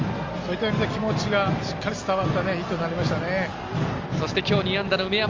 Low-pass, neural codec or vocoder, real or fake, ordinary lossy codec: 7.2 kHz; none; real; Opus, 32 kbps